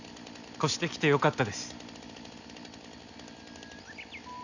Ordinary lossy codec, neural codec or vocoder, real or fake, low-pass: none; none; real; 7.2 kHz